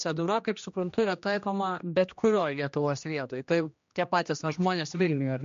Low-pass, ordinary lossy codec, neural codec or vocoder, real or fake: 7.2 kHz; MP3, 48 kbps; codec, 16 kHz, 1 kbps, X-Codec, HuBERT features, trained on general audio; fake